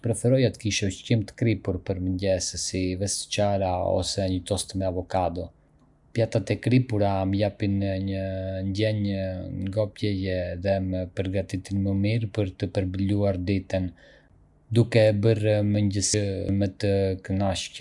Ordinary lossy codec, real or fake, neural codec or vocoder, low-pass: none; real; none; 10.8 kHz